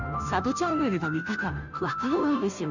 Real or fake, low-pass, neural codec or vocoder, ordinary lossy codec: fake; 7.2 kHz; codec, 16 kHz, 0.5 kbps, FunCodec, trained on Chinese and English, 25 frames a second; none